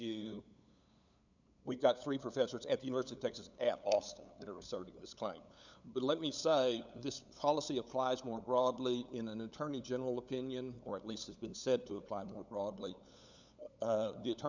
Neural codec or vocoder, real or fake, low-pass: codec, 16 kHz, 8 kbps, FunCodec, trained on LibriTTS, 25 frames a second; fake; 7.2 kHz